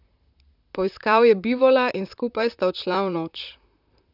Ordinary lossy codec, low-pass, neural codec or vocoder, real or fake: none; 5.4 kHz; vocoder, 44.1 kHz, 128 mel bands, Pupu-Vocoder; fake